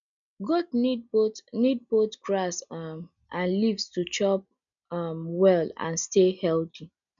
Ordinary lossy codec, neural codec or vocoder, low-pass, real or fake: none; none; 7.2 kHz; real